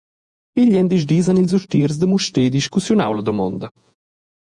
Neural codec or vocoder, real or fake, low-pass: vocoder, 48 kHz, 128 mel bands, Vocos; fake; 10.8 kHz